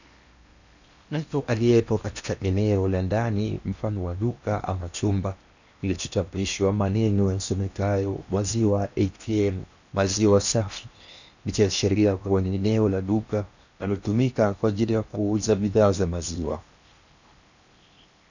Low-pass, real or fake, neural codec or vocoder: 7.2 kHz; fake; codec, 16 kHz in and 24 kHz out, 0.8 kbps, FocalCodec, streaming, 65536 codes